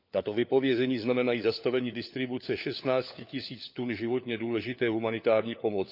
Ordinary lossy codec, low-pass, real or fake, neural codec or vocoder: none; 5.4 kHz; fake; codec, 16 kHz, 4 kbps, FunCodec, trained on LibriTTS, 50 frames a second